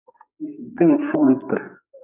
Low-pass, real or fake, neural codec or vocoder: 3.6 kHz; fake; codec, 44.1 kHz, 2.6 kbps, SNAC